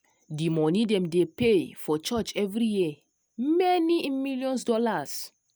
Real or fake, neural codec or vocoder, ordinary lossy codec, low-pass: real; none; none; none